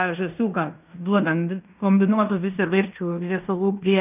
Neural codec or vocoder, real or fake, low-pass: codec, 16 kHz in and 24 kHz out, 0.9 kbps, LongCat-Audio-Codec, fine tuned four codebook decoder; fake; 3.6 kHz